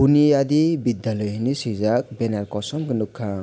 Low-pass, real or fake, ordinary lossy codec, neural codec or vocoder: none; real; none; none